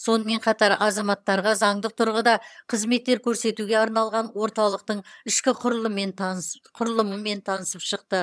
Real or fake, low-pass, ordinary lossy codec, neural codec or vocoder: fake; none; none; vocoder, 22.05 kHz, 80 mel bands, HiFi-GAN